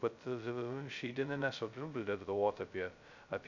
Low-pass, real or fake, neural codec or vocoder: 7.2 kHz; fake; codec, 16 kHz, 0.2 kbps, FocalCodec